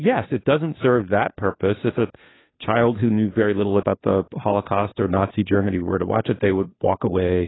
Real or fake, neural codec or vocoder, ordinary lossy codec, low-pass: fake; codec, 16 kHz, 2 kbps, FunCodec, trained on Chinese and English, 25 frames a second; AAC, 16 kbps; 7.2 kHz